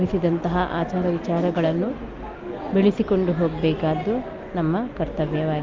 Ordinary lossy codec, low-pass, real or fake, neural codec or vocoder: Opus, 24 kbps; 7.2 kHz; real; none